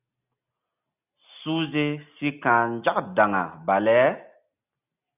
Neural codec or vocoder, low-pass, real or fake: none; 3.6 kHz; real